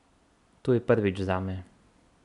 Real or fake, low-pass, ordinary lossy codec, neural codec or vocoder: real; 10.8 kHz; none; none